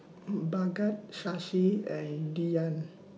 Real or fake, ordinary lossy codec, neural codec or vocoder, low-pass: real; none; none; none